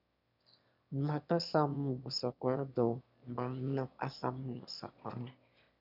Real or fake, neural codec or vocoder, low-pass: fake; autoencoder, 22.05 kHz, a latent of 192 numbers a frame, VITS, trained on one speaker; 5.4 kHz